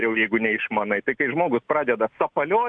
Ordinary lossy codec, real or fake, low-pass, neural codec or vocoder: AAC, 64 kbps; real; 9.9 kHz; none